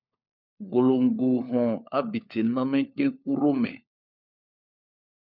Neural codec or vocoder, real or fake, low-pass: codec, 16 kHz, 4 kbps, FunCodec, trained on LibriTTS, 50 frames a second; fake; 5.4 kHz